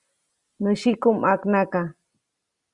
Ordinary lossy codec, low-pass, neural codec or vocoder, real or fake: Opus, 64 kbps; 10.8 kHz; none; real